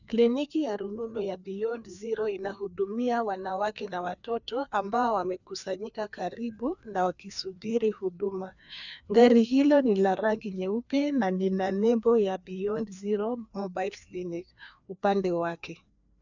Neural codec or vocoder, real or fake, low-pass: codec, 16 kHz, 2 kbps, FreqCodec, larger model; fake; 7.2 kHz